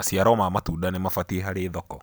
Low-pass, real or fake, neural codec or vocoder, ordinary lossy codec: none; fake; vocoder, 44.1 kHz, 128 mel bands every 256 samples, BigVGAN v2; none